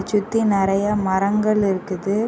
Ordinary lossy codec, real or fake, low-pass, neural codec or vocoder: none; real; none; none